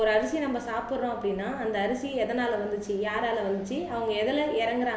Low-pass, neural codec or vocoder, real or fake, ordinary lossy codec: none; none; real; none